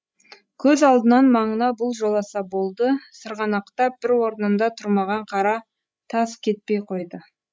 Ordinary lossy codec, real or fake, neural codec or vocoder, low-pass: none; fake; codec, 16 kHz, 16 kbps, FreqCodec, larger model; none